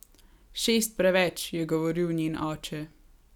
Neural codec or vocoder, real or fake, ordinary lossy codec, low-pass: none; real; none; 19.8 kHz